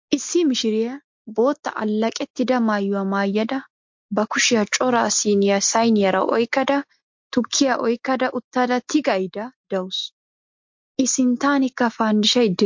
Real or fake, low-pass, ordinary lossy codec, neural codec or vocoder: real; 7.2 kHz; MP3, 48 kbps; none